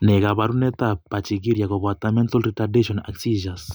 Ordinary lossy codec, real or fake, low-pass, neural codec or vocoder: none; real; none; none